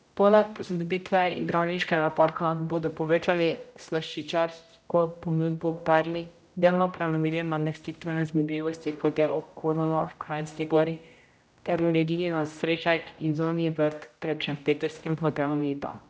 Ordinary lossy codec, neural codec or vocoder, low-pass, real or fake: none; codec, 16 kHz, 0.5 kbps, X-Codec, HuBERT features, trained on general audio; none; fake